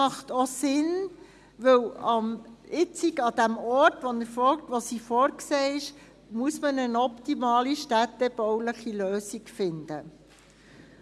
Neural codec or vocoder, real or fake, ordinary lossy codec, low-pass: none; real; none; none